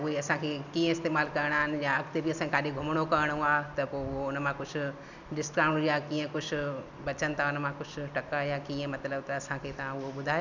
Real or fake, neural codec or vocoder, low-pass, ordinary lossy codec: real; none; 7.2 kHz; none